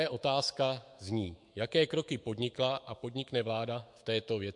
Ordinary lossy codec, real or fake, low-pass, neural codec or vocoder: MP3, 64 kbps; fake; 10.8 kHz; autoencoder, 48 kHz, 128 numbers a frame, DAC-VAE, trained on Japanese speech